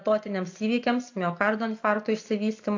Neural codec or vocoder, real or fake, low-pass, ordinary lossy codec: none; real; 7.2 kHz; AAC, 48 kbps